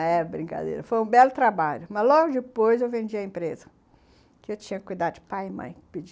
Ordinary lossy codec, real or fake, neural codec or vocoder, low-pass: none; real; none; none